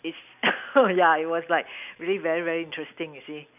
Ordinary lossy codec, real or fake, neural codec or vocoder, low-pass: none; real; none; 3.6 kHz